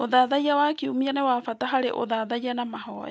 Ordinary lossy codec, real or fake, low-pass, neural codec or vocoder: none; real; none; none